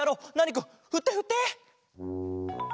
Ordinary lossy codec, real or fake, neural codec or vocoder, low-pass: none; real; none; none